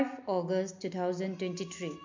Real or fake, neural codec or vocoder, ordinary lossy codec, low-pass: real; none; MP3, 48 kbps; 7.2 kHz